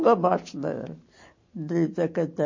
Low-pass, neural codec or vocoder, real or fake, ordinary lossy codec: 7.2 kHz; none; real; MP3, 32 kbps